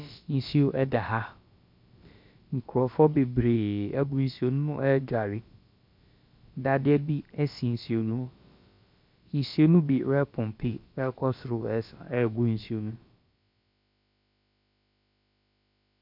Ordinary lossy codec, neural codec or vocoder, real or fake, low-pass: MP3, 48 kbps; codec, 16 kHz, about 1 kbps, DyCAST, with the encoder's durations; fake; 5.4 kHz